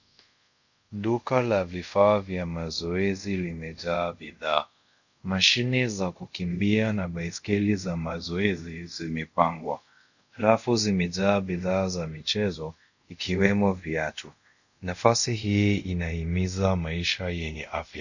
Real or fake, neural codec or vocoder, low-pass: fake; codec, 24 kHz, 0.5 kbps, DualCodec; 7.2 kHz